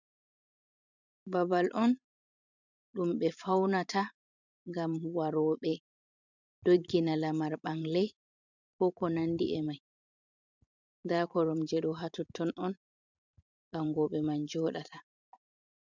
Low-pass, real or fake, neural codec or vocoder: 7.2 kHz; fake; vocoder, 44.1 kHz, 128 mel bands every 512 samples, BigVGAN v2